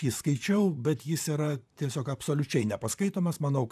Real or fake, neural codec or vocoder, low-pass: fake; vocoder, 44.1 kHz, 128 mel bands, Pupu-Vocoder; 14.4 kHz